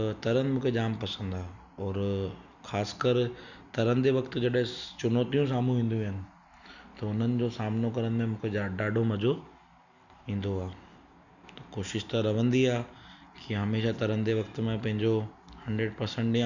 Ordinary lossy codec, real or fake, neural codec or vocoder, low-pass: none; real; none; 7.2 kHz